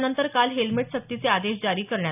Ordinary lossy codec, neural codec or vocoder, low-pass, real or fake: none; none; 3.6 kHz; real